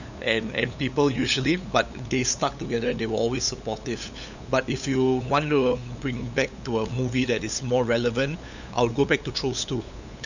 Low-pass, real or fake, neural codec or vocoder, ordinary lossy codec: 7.2 kHz; fake; codec, 16 kHz, 8 kbps, FunCodec, trained on LibriTTS, 25 frames a second; none